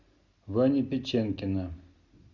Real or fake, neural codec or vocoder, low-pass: real; none; 7.2 kHz